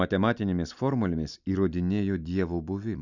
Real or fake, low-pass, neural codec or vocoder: real; 7.2 kHz; none